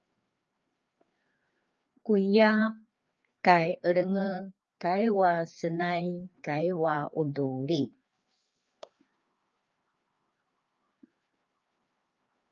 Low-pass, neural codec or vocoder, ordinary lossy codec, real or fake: 7.2 kHz; codec, 16 kHz, 2 kbps, FreqCodec, larger model; Opus, 24 kbps; fake